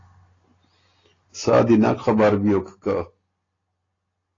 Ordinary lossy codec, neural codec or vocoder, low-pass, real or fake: AAC, 32 kbps; none; 7.2 kHz; real